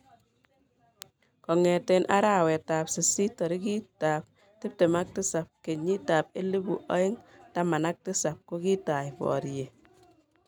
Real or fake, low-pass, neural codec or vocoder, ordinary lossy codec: real; 19.8 kHz; none; none